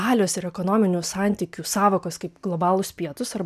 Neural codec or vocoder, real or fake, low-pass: none; real; 14.4 kHz